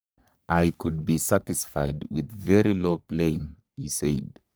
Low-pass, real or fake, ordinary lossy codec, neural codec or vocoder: none; fake; none; codec, 44.1 kHz, 3.4 kbps, Pupu-Codec